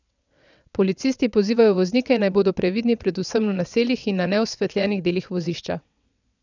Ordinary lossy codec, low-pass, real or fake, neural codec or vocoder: none; 7.2 kHz; fake; vocoder, 22.05 kHz, 80 mel bands, WaveNeXt